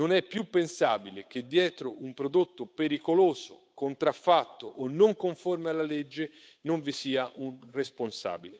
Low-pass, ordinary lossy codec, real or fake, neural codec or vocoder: none; none; fake; codec, 16 kHz, 8 kbps, FunCodec, trained on Chinese and English, 25 frames a second